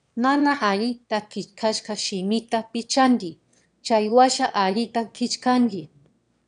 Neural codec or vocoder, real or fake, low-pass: autoencoder, 22.05 kHz, a latent of 192 numbers a frame, VITS, trained on one speaker; fake; 9.9 kHz